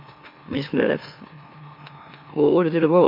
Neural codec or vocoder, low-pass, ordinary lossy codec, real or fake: autoencoder, 44.1 kHz, a latent of 192 numbers a frame, MeloTTS; 5.4 kHz; MP3, 32 kbps; fake